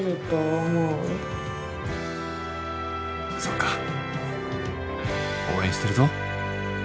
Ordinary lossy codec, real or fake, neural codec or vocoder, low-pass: none; real; none; none